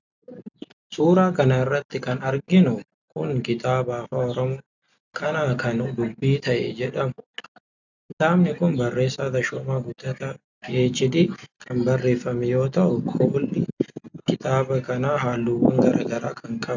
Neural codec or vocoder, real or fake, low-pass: none; real; 7.2 kHz